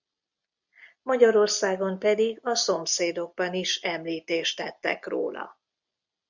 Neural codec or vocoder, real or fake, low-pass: none; real; 7.2 kHz